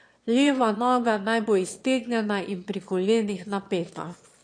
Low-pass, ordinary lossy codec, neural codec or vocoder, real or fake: 9.9 kHz; MP3, 48 kbps; autoencoder, 22.05 kHz, a latent of 192 numbers a frame, VITS, trained on one speaker; fake